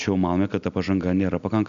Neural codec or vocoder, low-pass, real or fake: none; 7.2 kHz; real